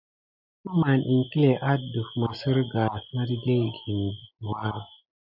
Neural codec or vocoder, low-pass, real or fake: none; 5.4 kHz; real